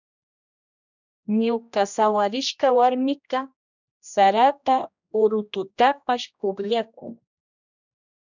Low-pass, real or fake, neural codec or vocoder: 7.2 kHz; fake; codec, 16 kHz, 1 kbps, X-Codec, HuBERT features, trained on general audio